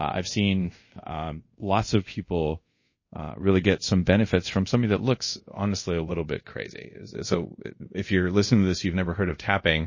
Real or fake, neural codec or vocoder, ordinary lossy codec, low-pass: fake; codec, 24 kHz, 0.5 kbps, DualCodec; MP3, 32 kbps; 7.2 kHz